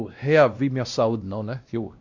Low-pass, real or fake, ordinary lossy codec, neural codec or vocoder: 7.2 kHz; fake; Opus, 64 kbps; codec, 16 kHz, 1 kbps, X-Codec, WavLM features, trained on Multilingual LibriSpeech